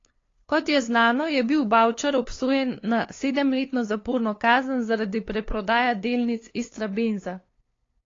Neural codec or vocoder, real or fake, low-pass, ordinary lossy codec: codec, 16 kHz, 2 kbps, FunCodec, trained on LibriTTS, 25 frames a second; fake; 7.2 kHz; AAC, 32 kbps